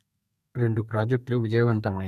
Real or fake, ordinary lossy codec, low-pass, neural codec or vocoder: fake; none; 14.4 kHz; codec, 32 kHz, 1.9 kbps, SNAC